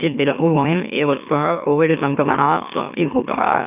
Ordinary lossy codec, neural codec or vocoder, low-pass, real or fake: none; autoencoder, 44.1 kHz, a latent of 192 numbers a frame, MeloTTS; 3.6 kHz; fake